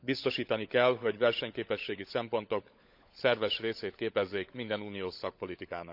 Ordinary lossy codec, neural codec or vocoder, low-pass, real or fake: none; codec, 16 kHz, 16 kbps, FunCodec, trained on LibriTTS, 50 frames a second; 5.4 kHz; fake